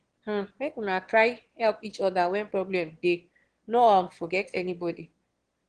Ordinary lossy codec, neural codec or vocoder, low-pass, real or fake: Opus, 16 kbps; autoencoder, 22.05 kHz, a latent of 192 numbers a frame, VITS, trained on one speaker; 9.9 kHz; fake